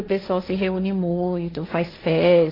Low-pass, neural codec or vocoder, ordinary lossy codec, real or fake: 5.4 kHz; codec, 16 kHz, 1.1 kbps, Voila-Tokenizer; AAC, 24 kbps; fake